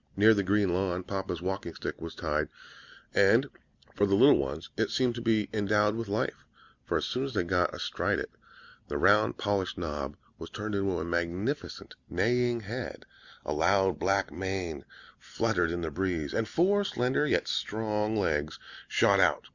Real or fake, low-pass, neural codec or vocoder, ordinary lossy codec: real; 7.2 kHz; none; Opus, 64 kbps